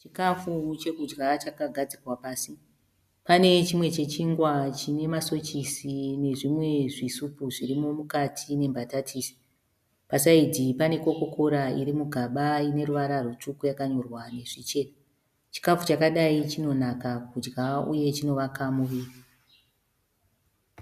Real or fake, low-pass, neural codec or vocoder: real; 14.4 kHz; none